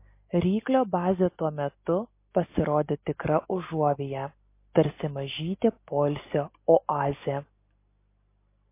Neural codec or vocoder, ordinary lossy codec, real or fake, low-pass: none; MP3, 24 kbps; real; 3.6 kHz